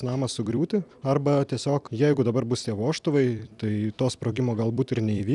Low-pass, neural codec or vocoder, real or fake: 10.8 kHz; vocoder, 44.1 kHz, 128 mel bands every 256 samples, BigVGAN v2; fake